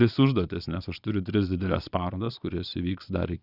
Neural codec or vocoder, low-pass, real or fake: vocoder, 22.05 kHz, 80 mel bands, Vocos; 5.4 kHz; fake